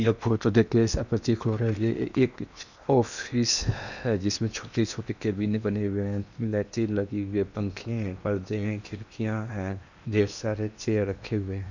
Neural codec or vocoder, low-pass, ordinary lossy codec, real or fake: codec, 16 kHz in and 24 kHz out, 0.8 kbps, FocalCodec, streaming, 65536 codes; 7.2 kHz; none; fake